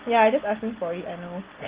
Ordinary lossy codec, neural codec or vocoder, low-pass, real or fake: Opus, 16 kbps; none; 3.6 kHz; real